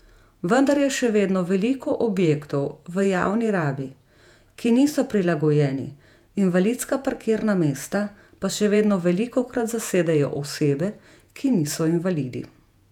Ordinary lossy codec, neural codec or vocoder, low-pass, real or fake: none; vocoder, 48 kHz, 128 mel bands, Vocos; 19.8 kHz; fake